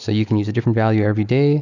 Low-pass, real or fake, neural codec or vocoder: 7.2 kHz; real; none